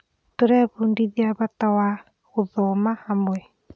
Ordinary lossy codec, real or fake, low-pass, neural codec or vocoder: none; real; none; none